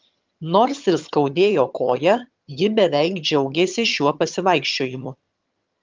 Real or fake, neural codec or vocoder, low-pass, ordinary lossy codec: fake; vocoder, 22.05 kHz, 80 mel bands, HiFi-GAN; 7.2 kHz; Opus, 24 kbps